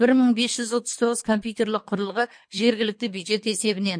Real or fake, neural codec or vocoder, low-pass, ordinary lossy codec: fake; codec, 24 kHz, 3 kbps, HILCodec; 9.9 kHz; MP3, 48 kbps